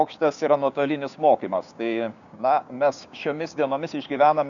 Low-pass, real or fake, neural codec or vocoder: 7.2 kHz; fake; codec, 16 kHz, 6 kbps, DAC